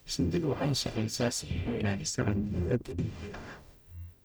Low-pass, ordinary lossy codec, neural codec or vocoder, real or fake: none; none; codec, 44.1 kHz, 0.9 kbps, DAC; fake